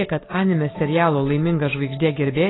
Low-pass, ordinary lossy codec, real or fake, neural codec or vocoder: 7.2 kHz; AAC, 16 kbps; real; none